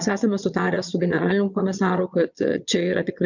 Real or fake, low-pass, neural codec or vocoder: fake; 7.2 kHz; vocoder, 22.05 kHz, 80 mel bands, Vocos